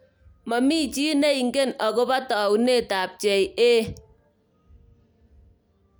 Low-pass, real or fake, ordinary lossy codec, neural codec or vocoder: none; real; none; none